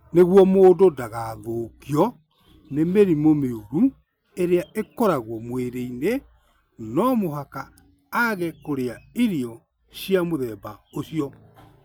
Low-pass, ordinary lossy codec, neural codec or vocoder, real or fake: none; none; none; real